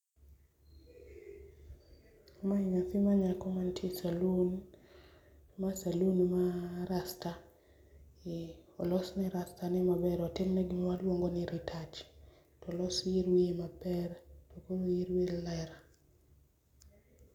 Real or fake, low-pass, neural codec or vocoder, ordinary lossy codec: real; 19.8 kHz; none; none